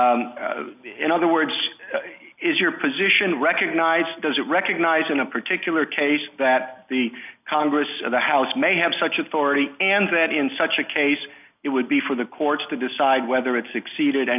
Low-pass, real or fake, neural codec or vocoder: 3.6 kHz; real; none